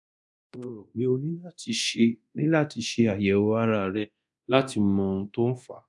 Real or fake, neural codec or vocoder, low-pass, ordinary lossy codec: fake; codec, 24 kHz, 0.9 kbps, DualCodec; 10.8 kHz; none